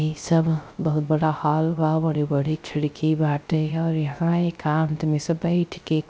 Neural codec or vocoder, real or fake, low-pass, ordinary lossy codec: codec, 16 kHz, 0.3 kbps, FocalCodec; fake; none; none